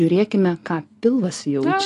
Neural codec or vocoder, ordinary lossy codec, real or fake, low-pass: none; AAC, 48 kbps; real; 10.8 kHz